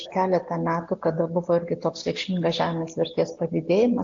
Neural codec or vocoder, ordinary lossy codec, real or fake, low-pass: none; AAC, 48 kbps; real; 7.2 kHz